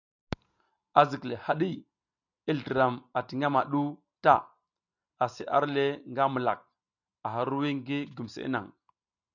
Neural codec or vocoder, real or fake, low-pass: none; real; 7.2 kHz